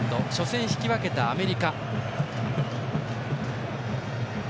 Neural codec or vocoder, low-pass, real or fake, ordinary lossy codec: none; none; real; none